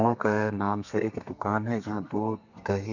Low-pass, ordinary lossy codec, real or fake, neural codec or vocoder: 7.2 kHz; none; fake; codec, 32 kHz, 1.9 kbps, SNAC